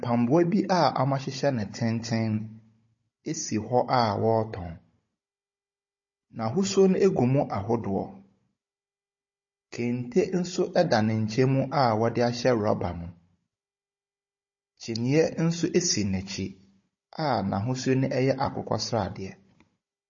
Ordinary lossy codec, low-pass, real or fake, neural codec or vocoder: MP3, 32 kbps; 7.2 kHz; fake; codec, 16 kHz, 16 kbps, FunCodec, trained on Chinese and English, 50 frames a second